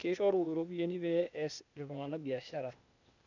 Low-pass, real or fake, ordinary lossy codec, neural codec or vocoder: 7.2 kHz; fake; none; codec, 16 kHz, 0.8 kbps, ZipCodec